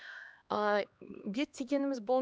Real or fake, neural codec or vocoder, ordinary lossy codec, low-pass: fake; codec, 16 kHz, 2 kbps, X-Codec, HuBERT features, trained on LibriSpeech; none; none